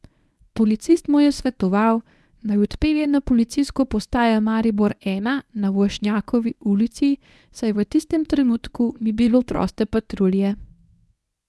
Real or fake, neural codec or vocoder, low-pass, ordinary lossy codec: fake; codec, 24 kHz, 0.9 kbps, WavTokenizer, medium speech release version 1; none; none